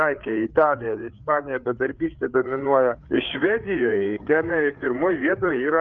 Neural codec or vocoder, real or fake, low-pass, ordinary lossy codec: codec, 16 kHz, 4 kbps, FreqCodec, larger model; fake; 7.2 kHz; Opus, 24 kbps